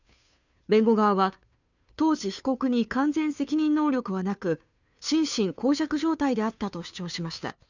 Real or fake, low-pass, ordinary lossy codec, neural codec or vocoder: fake; 7.2 kHz; none; codec, 16 kHz, 2 kbps, FunCodec, trained on Chinese and English, 25 frames a second